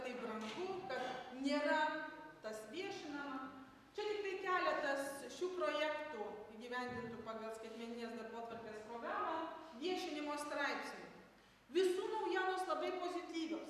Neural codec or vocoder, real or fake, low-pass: none; real; 14.4 kHz